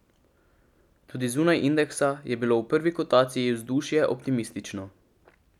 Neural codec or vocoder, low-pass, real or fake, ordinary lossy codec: none; 19.8 kHz; real; none